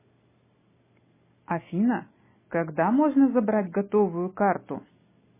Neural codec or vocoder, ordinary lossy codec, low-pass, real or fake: none; MP3, 16 kbps; 3.6 kHz; real